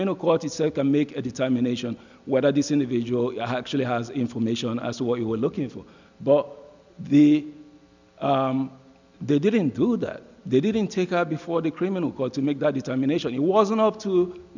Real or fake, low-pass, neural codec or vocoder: real; 7.2 kHz; none